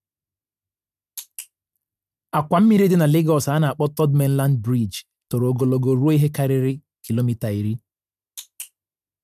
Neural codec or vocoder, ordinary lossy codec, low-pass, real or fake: none; none; 14.4 kHz; real